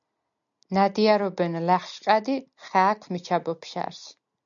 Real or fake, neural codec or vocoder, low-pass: real; none; 7.2 kHz